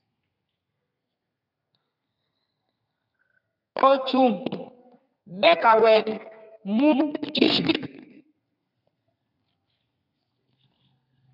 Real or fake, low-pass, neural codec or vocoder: fake; 5.4 kHz; codec, 32 kHz, 1.9 kbps, SNAC